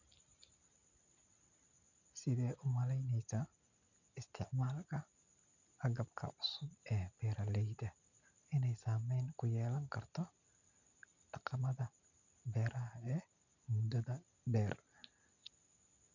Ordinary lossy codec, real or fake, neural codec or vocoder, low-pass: none; real; none; 7.2 kHz